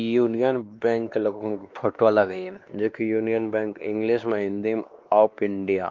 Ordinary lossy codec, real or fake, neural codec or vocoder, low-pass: Opus, 32 kbps; fake; codec, 16 kHz, 2 kbps, X-Codec, WavLM features, trained on Multilingual LibriSpeech; 7.2 kHz